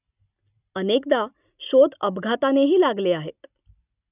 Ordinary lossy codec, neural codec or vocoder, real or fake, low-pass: none; none; real; 3.6 kHz